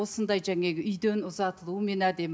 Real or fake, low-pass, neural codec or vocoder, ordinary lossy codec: real; none; none; none